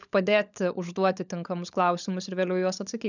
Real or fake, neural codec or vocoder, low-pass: fake; codec, 16 kHz, 16 kbps, FunCodec, trained on LibriTTS, 50 frames a second; 7.2 kHz